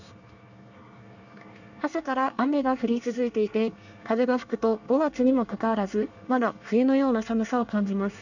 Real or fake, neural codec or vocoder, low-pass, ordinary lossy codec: fake; codec, 24 kHz, 1 kbps, SNAC; 7.2 kHz; none